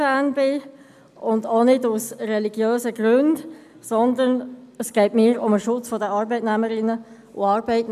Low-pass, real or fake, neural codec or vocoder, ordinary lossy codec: 14.4 kHz; real; none; none